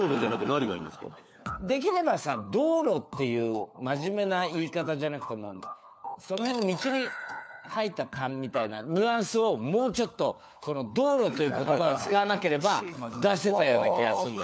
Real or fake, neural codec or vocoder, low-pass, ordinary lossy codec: fake; codec, 16 kHz, 4 kbps, FunCodec, trained on LibriTTS, 50 frames a second; none; none